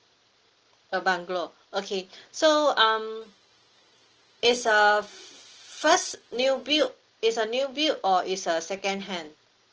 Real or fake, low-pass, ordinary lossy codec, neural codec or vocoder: real; 7.2 kHz; Opus, 16 kbps; none